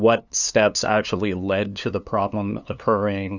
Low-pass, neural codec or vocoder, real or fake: 7.2 kHz; codec, 16 kHz, 2 kbps, FunCodec, trained on LibriTTS, 25 frames a second; fake